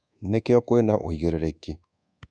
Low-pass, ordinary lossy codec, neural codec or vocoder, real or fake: 9.9 kHz; none; codec, 24 kHz, 1.2 kbps, DualCodec; fake